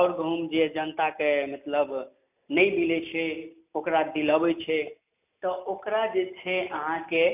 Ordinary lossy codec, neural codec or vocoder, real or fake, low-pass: none; none; real; 3.6 kHz